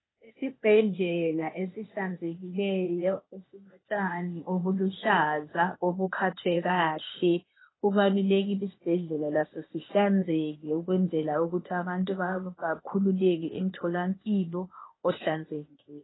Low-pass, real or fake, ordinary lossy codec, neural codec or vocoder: 7.2 kHz; fake; AAC, 16 kbps; codec, 16 kHz, 0.8 kbps, ZipCodec